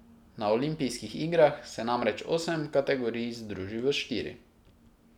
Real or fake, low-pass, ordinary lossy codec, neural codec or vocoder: real; 19.8 kHz; none; none